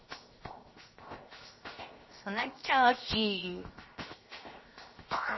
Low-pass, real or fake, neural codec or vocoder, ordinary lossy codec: 7.2 kHz; fake; codec, 16 kHz, 0.7 kbps, FocalCodec; MP3, 24 kbps